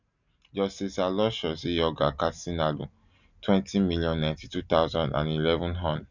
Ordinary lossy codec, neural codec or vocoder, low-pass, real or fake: none; none; 7.2 kHz; real